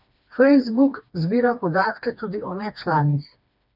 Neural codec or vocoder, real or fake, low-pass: codec, 16 kHz, 2 kbps, FreqCodec, smaller model; fake; 5.4 kHz